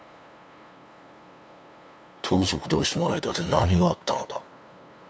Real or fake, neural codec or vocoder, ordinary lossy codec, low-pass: fake; codec, 16 kHz, 2 kbps, FunCodec, trained on LibriTTS, 25 frames a second; none; none